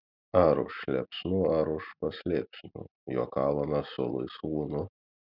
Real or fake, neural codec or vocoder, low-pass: real; none; 5.4 kHz